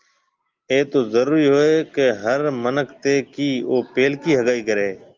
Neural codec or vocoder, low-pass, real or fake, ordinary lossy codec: none; 7.2 kHz; real; Opus, 32 kbps